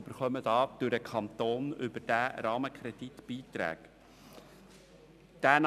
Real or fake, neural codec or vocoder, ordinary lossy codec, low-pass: real; none; none; 14.4 kHz